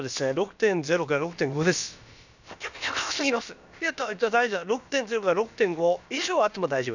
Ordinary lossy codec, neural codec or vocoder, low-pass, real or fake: none; codec, 16 kHz, about 1 kbps, DyCAST, with the encoder's durations; 7.2 kHz; fake